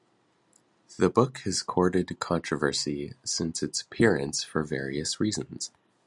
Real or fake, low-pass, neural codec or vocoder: real; 10.8 kHz; none